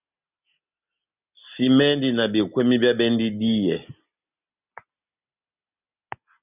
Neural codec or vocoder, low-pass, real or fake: none; 3.6 kHz; real